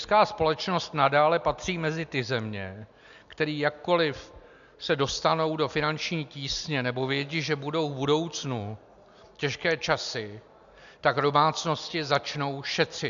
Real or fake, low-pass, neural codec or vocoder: real; 7.2 kHz; none